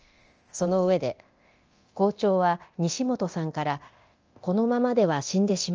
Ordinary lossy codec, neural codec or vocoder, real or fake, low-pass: Opus, 24 kbps; codec, 24 kHz, 0.9 kbps, DualCodec; fake; 7.2 kHz